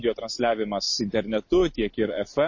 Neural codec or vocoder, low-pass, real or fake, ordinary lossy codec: none; 7.2 kHz; real; MP3, 32 kbps